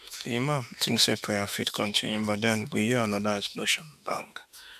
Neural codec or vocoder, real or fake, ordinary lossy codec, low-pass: autoencoder, 48 kHz, 32 numbers a frame, DAC-VAE, trained on Japanese speech; fake; none; 14.4 kHz